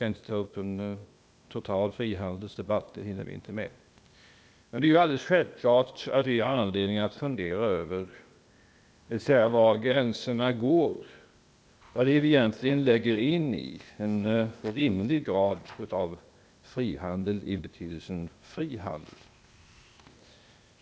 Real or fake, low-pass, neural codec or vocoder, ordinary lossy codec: fake; none; codec, 16 kHz, 0.8 kbps, ZipCodec; none